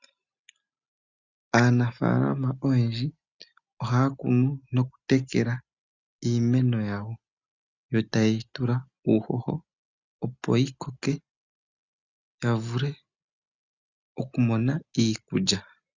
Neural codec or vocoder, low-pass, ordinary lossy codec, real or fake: none; 7.2 kHz; Opus, 64 kbps; real